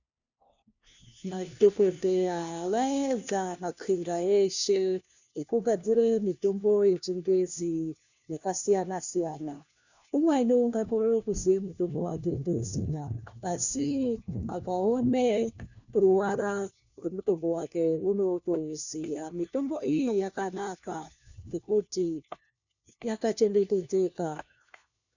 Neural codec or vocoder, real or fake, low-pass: codec, 16 kHz, 1 kbps, FunCodec, trained on LibriTTS, 50 frames a second; fake; 7.2 kHz